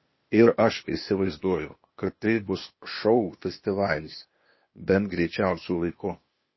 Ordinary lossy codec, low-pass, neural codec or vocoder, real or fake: MP3, 24 kbps; 7.2 kHz; codec, 16 kHz, 0.8 kbps, ZipCodec; fake